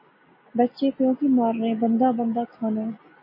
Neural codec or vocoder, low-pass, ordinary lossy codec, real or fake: none; 5.4 kHz; MP3, 24 kbps; real